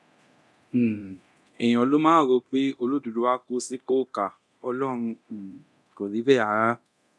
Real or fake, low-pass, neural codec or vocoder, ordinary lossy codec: fake; none; codec, 24 kHz, 0.9 kbps, DualCodec; none